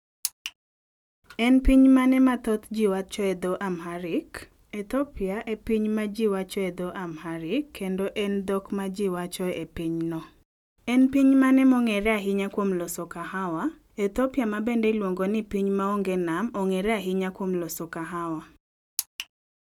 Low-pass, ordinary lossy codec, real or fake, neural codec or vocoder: 19.8 kHz; none; real; none